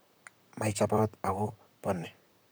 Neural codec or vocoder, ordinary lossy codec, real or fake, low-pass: vocoder, 44.1 kHz, 128 mel bands, Pupu-Vocoder; none; fake; none